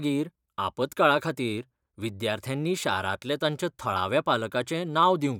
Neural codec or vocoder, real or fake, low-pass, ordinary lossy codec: vocoder, 44.1 kHz, 128 mel bands every 512 samples, BigVGAN v2; fake; 19.8 kHz; none